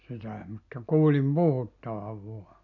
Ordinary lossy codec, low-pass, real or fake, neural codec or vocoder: none; 7.2 kHz; real; none